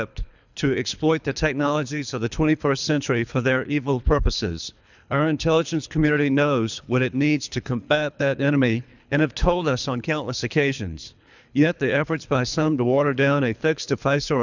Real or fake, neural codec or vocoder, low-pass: fake; codec, 24 kHz, 3 kbps, HILCodec; 7.2 kHz